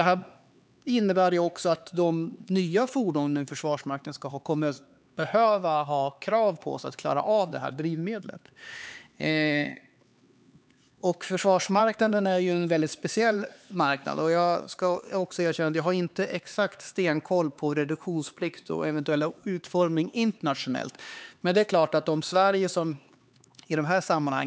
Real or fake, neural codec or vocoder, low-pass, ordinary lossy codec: fake; codec, 16 kHz, 4 kbps, X-Codec, HuBERT features, trained on LibriSpeech; none; none